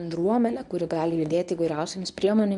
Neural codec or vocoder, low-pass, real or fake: codec, 24 kHz, 0.9 kbps, WavTokenizer, medium speech release version 1; 10.8 kHz; fake